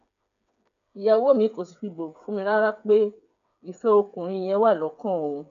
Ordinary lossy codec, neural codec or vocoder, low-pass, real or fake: none; codec, 16 kHz, 8 kbps, FreqCodec, smaller model; 7.2 kHz; fake